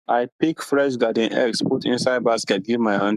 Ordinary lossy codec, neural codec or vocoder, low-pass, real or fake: none; codec, 44.1 kHz, 7.8 kbps, Pupu-Codec; 14.4 kHz; fake